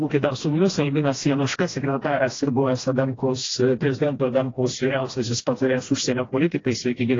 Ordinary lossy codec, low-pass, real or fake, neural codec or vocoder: AAC, 32 kbps; 7.2 kHz; fake; codec, 16 kHz, 1 kbps, FreqCodec, smaller model